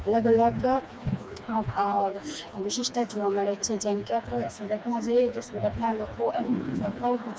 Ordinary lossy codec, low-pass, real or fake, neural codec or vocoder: none; none; fake; codec, 16 kHz, 2 kbps, FreqCodec, smaller model